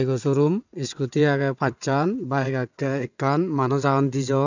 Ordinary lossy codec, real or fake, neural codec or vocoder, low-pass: none; fake; vocoder, 22.05 kHz, 80 mel bands, WaveNeXt; 7.2 kHz